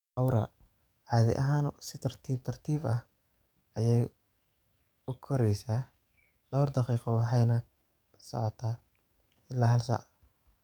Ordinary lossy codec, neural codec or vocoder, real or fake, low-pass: none; codec, 44.1 kHz, 7.8 kbps, DAC; fake; 19.8 kHz